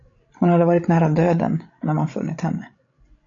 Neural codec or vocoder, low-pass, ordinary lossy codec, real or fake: codec, 16 kHz, 16 kbps, FreqCodec, larger model; 7.2 kHz; AAC, 48 kbps; fake